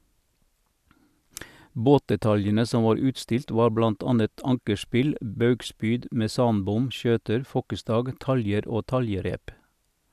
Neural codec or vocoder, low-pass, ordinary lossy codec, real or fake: none; 14.4 kHz; none; real